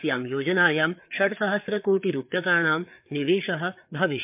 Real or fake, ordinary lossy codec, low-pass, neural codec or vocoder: fake; MP3, 32 kbps; 3.6 kHz; codec, 16 kHz, 4 kbps, FreqCodec, larger model